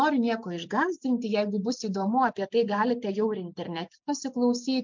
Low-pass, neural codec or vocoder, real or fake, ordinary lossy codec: 7.2 kHz; none; real; MP3, 64 kbps